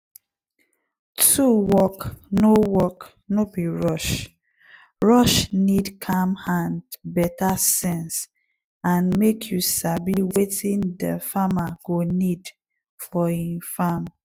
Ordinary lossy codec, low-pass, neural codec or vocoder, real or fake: none; 19.8 kHz; none; real